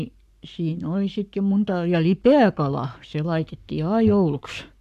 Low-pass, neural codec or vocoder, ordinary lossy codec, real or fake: 14.4 kHz; codec, 44.1 kHz, 7.8 kbps, Pupu-Codec; MP3, 96 kbps; fake